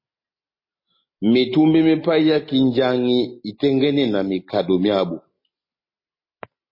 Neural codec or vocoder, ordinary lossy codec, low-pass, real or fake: none; MP3, 24 kbps; 5.4 kHz; real